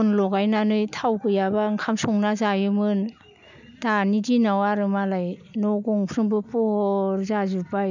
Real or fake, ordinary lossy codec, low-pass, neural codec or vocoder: real; none; 7.2 kHz; none